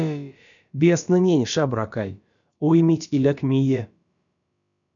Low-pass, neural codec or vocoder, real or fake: 7.2 kHz; codec, 16 kHz, about 1 kbps, DyCAST, with the encoder's durations; fake